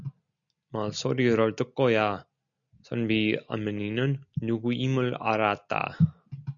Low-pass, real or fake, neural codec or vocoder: 7.2 kHz; real; none